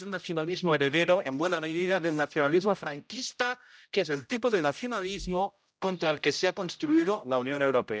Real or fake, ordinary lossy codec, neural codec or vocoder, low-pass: fake; none; codec, 16 kHz, 0.5 kbps, X-Codec, HuBERT features, trained on general audio; none